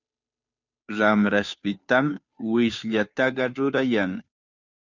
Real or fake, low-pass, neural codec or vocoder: fake; 7.2 kHz; codec, 16 kHz, 2 kbps, FunCodec, trained on Chinese and English, 25 frames a second